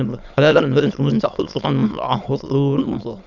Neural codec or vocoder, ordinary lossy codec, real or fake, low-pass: autoencoder, 22.05 kHz, a latent of 192 numbers a frame, VITS, trained on many speakers; none; fake; 7.2 kHz